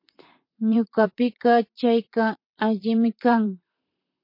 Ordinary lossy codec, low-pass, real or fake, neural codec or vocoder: MP3, 32 kbps; 5.4 kHz; fake; vocoder, 44.1 kHz, 128 mel bands, Pupu-Vocoder